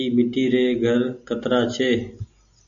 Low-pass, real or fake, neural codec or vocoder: 7.2 kHz; real; none